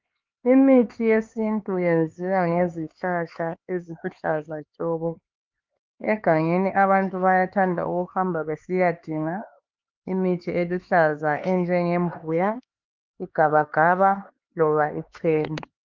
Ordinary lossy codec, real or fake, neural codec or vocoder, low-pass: Opus, 24 kbps; fake; codec, 16 kHz, 4 kbps, X-Codec, HuBERT features, trained on LibriSpeech; 7.2 kHz